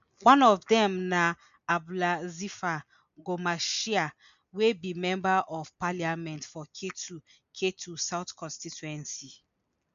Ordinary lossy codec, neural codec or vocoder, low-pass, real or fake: AAC, 96 kbps; none; 7.2 kHz; real